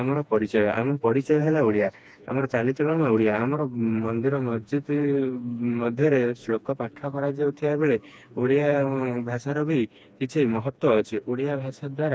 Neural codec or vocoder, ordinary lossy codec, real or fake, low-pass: codec, 16 kHz, 2 kbps, FreqCodec, smaller model; none; fake; none